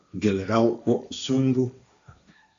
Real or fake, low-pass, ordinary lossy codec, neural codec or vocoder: fake; 7.2 kHz; AAC, 64 kbps; codec, 16 kHz, 1.1 kbps, Voila-Tokenizer